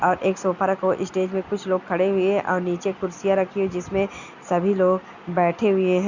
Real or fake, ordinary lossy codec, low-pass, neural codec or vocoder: real; Opus, 64 kbps; 7.2 kHz; none